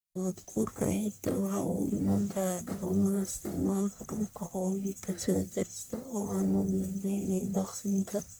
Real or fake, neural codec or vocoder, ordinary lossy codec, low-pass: fake; codec, 44.1 kHz, 1.7 kbps, Pupu-Codec; none; none